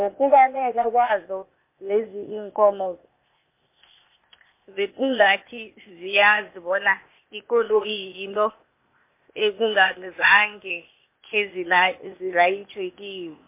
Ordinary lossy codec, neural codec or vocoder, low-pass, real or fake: MP3, 24 kbps; codec, 16 kHz, 0.8 kbps, ZipCodec; 3.6 kHz; fake